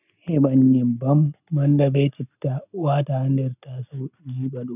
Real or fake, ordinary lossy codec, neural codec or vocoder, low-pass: real; none; none; 3.6 kHz